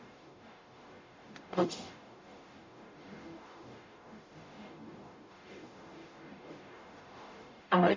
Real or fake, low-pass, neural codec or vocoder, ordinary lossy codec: fake; 7.2 kHz; codec, 44.1 kHz, 0.9 kbps, DAC; MP3, 64 kbps